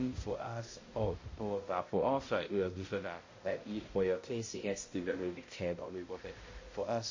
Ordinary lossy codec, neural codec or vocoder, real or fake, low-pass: MP3, 32 kbps; codec, 16 kHz, 0.5 kbps, X-Codec, HuBERT features, trained on balanced general audio; fake; 7.2 kHz